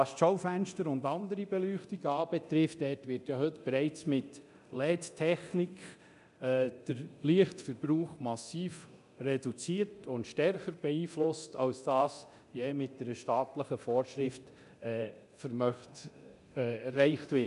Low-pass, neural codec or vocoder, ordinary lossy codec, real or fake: 10.8 kHz; codec, 24 kHz, 0.9 kbps, DualCodec; none; fake